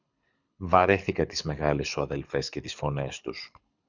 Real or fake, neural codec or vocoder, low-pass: fake; codec, 24 kHz, 6 kbps, HILCodec; 7.2 kHz